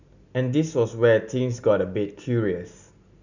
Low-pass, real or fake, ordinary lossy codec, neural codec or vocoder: 7.2 kHz; real; none; none